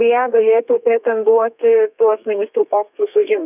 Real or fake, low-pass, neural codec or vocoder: fake; 3.6 kHz; codec, 32 kHz, 1.9 kbps, SNAC